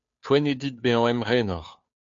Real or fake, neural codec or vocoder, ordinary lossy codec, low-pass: fake; codec, 16 kHz, 2 kbps, FunCodec, trained on Chinese and English, 25 frames a second; AAC, 64 kbps; 7.2 kHz